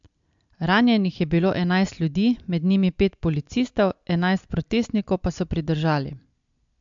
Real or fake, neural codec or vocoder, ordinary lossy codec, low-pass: real; none; MP3, 64 kbps; 7.2 kHz